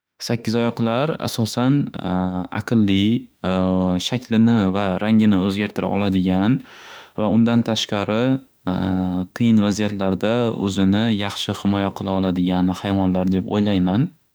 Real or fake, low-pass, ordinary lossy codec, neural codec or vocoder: fake; none; none; autoencoder, 48 kHz, 32 numbers a frame, DAC-VAE, trained on Japanese speech